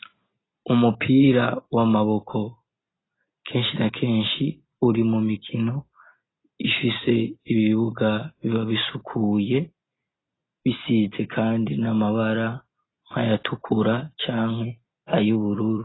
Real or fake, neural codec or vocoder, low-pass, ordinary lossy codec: real; none; 7.2 kHz; AAC, 16 kbps